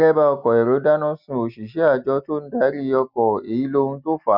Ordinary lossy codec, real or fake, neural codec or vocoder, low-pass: none; real; none; 5.4 kHz